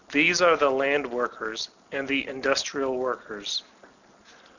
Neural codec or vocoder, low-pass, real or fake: none; 7.2 kHz; real